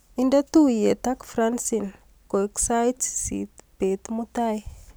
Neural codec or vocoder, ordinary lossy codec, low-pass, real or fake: none; none; none; real